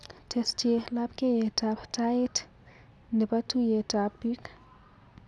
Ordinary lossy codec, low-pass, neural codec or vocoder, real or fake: none; none; none; real